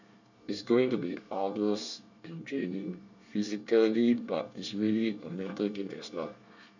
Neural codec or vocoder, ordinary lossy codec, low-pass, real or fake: codec, 24 kHz, 1 kbps, SNAC; none; 7.2 kHz; fake